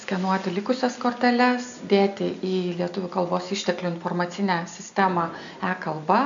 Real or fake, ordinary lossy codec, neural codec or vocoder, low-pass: real; MP3, 64 kbps; none; 7.2 kHz